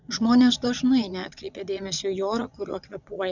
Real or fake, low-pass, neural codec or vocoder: fake; 7.2 kHz; vocoder, 22.05 kHz, 80 mel bands, Vocos